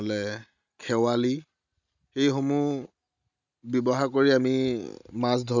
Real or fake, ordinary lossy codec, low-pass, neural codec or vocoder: real; none; 7.2 kHz; none